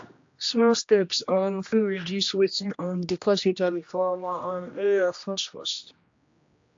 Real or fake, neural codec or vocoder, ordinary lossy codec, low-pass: fake; codec, 16 kHz, 1 kbps, X-Codec, HuBERT features, trained on general audio; AAC, 48 kbps; 7.2 kHz